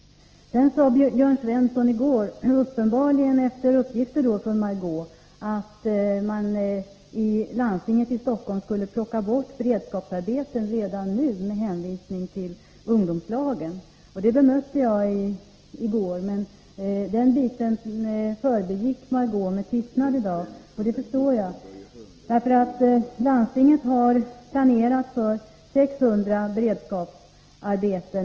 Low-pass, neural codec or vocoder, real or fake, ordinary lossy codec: 7.2 kHz; none; real; Opus, 16 kbps